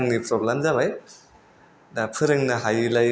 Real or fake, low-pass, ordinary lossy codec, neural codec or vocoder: real; none; none; none